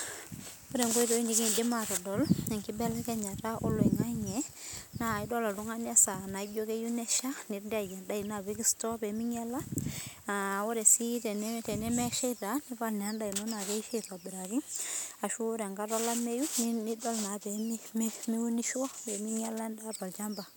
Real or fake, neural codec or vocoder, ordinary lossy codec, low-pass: real; none; none; none